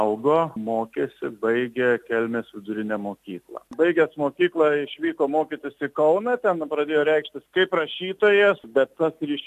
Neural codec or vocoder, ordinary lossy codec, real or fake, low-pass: none; AAC, 96 kbps; real; 14.4 kHz